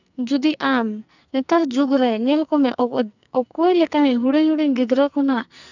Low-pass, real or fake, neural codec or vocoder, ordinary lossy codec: 7.2 kHz; fake; codec, 44.1 kHz, 2.6 kbps, SNAC; none